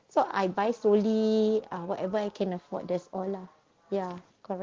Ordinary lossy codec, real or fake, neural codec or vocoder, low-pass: Opus, 16 kbps; real; none; 7.2 kHz